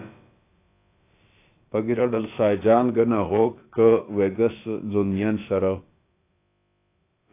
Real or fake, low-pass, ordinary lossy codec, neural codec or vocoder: fake; 3.6 kHz; AAC, 24 kbps; codec, 16 kHz, about 1 kbps, DyCAST, with the encoder's durations